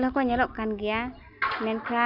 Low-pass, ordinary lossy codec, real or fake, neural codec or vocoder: 5.4 kHz; none; real; none